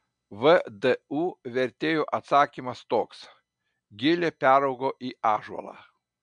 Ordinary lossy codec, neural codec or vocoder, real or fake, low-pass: MP3, 64 kbps; none; real; 9.9 kHz